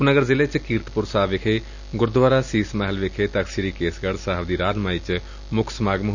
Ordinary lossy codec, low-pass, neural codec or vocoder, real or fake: none; 7.2 kHz; none; real